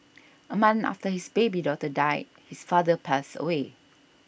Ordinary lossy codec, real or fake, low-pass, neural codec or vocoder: none; real; none; none